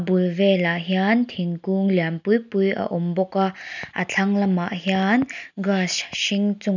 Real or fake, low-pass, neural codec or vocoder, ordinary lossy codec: real; 7.2 kHz; none; none